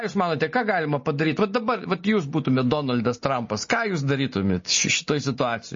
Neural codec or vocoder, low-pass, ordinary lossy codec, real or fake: none; 7.2 kHz; MP3, 32 kbps; real